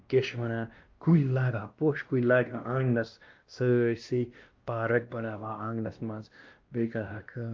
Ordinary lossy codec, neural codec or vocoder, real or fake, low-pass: Opus, 24 kbps; codec, 16 kHz, 1 kbps, X-Codec, WavLM features, trained on Multilingual LibriSpeech; fake; 7.2 kHz